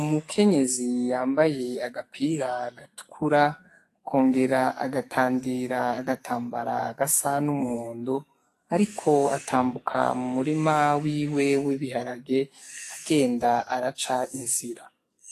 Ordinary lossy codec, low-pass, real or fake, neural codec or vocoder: AAC, 48 kbps; 14.4 kHz; fake; codec, 44.1 kHz, 2.6 kbps, SNAC